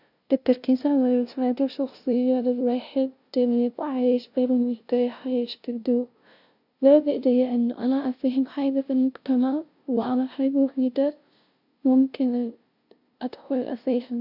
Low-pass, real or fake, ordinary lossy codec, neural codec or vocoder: 5.4 kHz; fake; none; codec, 16 kHz, 0.5 kbps, FunCodec, trained on LibriTTS, 25 frames a second